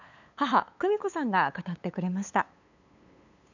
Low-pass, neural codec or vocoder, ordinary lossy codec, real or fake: 7.2 kHz; codec, 16 kHz, 8 kbps, FunCodec, trained on LibriTTS, 25 frames a second; none; fake